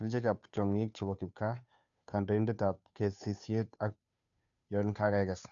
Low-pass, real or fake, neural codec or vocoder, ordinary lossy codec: 7.2 kHz; fake; codec, 16 kHz, 2 kbps, FunCodec, trained on Chinese and English, 25 frames a second; none